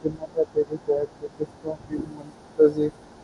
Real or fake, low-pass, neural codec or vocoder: real; 10.8 kHz; none